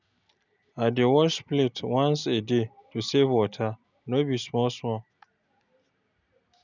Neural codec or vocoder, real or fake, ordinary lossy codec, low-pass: none; real; none; 7.2 kHz